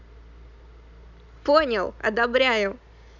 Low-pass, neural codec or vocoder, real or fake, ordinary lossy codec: 7.2 kHz; none; real; none